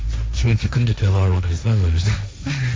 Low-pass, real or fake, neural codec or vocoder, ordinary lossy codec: none; fake; codec, 16 kHz, 1.1 kbps, Voila-Tokenizer; none